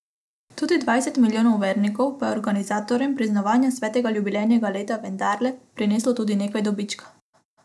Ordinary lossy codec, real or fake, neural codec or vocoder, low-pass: none; real; none; none